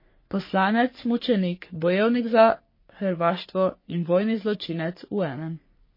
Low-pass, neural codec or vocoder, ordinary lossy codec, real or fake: 5.4 kHz; codec, 44.1 kHz, 3.4 kbps, Pupu-Codec; MP3, 24 kbps; fake